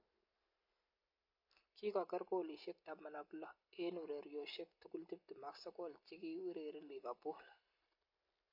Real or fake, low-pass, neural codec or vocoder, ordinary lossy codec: real; 5.4 kHz; none; MP3, 32 kbps